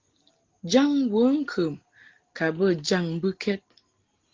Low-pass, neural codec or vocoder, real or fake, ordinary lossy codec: 7.2 kHz; none; real; Opus, 16 kbps